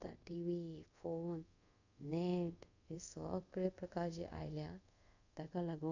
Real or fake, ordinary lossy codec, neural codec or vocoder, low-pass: fake; none; codec, 24 kHz, 0.5 kbps, DualCodec; 7.2 kHz